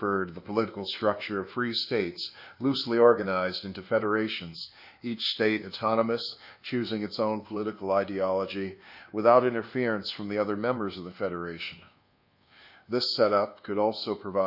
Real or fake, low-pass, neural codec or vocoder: fake; 5.4 kHz; codec, 24 kHz, 1.2 kbps, DualCodec